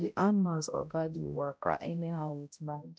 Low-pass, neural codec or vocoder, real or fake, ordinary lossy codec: none; codec, 16 kHz, 0.5 kbps, X-Codec, HuBERT features, trained on balanced general audio; fake; none